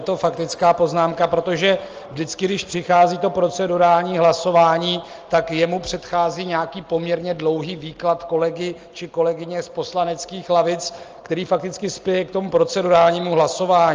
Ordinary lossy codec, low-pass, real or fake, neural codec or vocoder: Opus, 24 kbps; 7.2 kHz; real; none